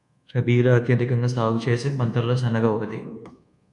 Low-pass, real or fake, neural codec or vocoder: 10.8 kHz; fake; codec, 24 kHz, 1.2 kbps, DualCodec